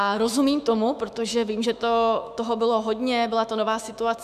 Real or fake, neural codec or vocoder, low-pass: fake; autoencoder, 48 kHz, 128 numbers a frame, DAC-VAE, trained on Japanese speech; 14.4 kHz